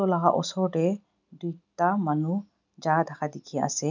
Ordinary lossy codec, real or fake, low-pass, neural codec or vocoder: none; real; 7.2 kHz; none